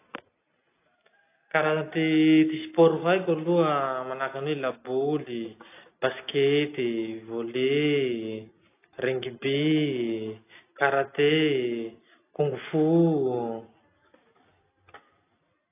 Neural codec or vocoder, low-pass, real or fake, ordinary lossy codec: none; 3.6 kHz; real; AAC, 24 kbps